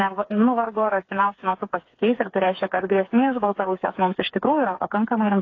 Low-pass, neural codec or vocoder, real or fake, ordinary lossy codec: 7.2 kHz; codec, 16 kHz, 4 kbps, FreqCodec, smaller model; fake; AAC, 32 kbps